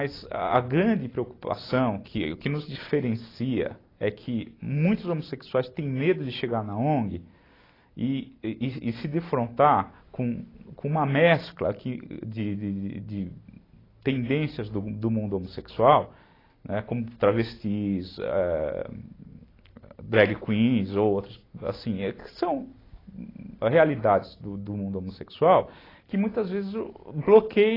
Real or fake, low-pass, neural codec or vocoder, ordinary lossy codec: real; 5.4 kHz; none; AAC, 24 kbps